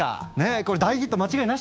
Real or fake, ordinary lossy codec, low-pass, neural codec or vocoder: fake; none; none; codec, 16 kHz, 6 kbps, DAC